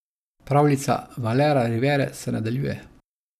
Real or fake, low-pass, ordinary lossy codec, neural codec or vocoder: real; 14.4 kHz; none; none